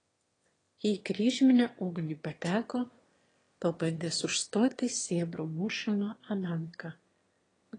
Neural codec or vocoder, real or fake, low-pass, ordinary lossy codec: autoencoder, 22.05 kHz, a latent of 192 numbers a frame, VITS, trained on one speaker; fake; 9.9 kHz; AAC, 32 kbps